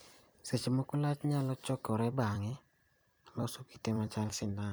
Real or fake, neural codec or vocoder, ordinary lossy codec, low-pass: fake; vocoder, 44.1 kHz, 128 mel bands every 256 samples, BigVGAN v2; none; none